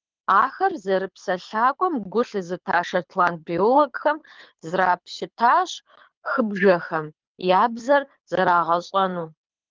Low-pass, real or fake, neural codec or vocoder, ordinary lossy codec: 7.2 kHz; fake; codec, 24 kHz, 6 kbps, HILCodec; Opus, 32 kbps